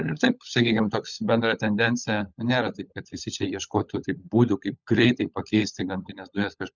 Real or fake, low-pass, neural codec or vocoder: fake; 7.2 kHz; codec, 16 kHz, 16 kbps, FunCodec, trained on LibriTTS, 50 frames a second